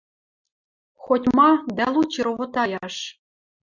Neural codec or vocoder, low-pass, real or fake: none; 7.2 kHz; real